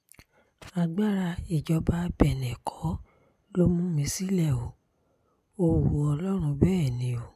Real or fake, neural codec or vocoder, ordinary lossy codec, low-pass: real; none; none; 14.4 kHz